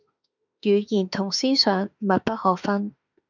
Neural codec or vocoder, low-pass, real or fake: autoencoder, 48 kHz, 32 numbers a frame, DAC-VAE, trained on Japanese speech; 7.2 kHz; fake